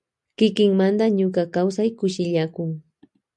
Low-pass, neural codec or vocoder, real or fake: 10.8 kHz; none; real